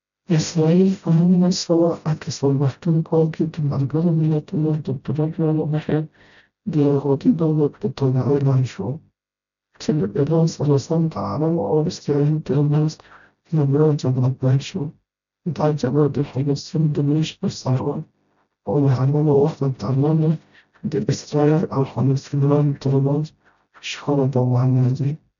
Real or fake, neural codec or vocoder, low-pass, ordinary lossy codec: fake; codec, 16 kHz, 0.5 kbps, FreqCodec, smaller model; 7.2 kHz; none